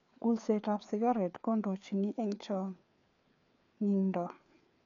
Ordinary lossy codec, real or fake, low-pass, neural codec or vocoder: none; fake; 7.2 kHz; codec, 16 kHz, 4 kbps, FreqCodec, larger model